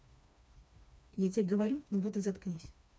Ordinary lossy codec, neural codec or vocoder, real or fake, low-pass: none; codec, 16 kHz, 2 kbps, FreqCodec, smaller model; fake; none